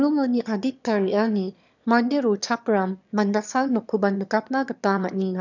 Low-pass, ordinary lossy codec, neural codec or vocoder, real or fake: 7.2 kHz; none; autoencoder, 22.05 kHz, a latent of 192 numbers a frame, VITS, trained on one speaker; fake